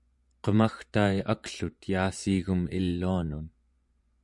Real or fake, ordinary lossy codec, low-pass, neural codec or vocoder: fake; MP3, 96 kbps; 10.8 kHz; vocoder, 24 kHz, 100 mel bands, Vocos